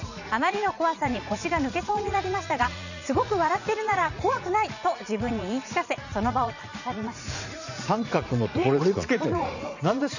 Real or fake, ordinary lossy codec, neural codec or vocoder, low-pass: fake; none; vocoder, 44.1 kHz, 80 mel bands, Vocos; 7.2 kHz